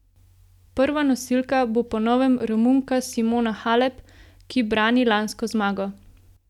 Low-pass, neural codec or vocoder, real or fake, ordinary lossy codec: 19.8 kHz; none; real; none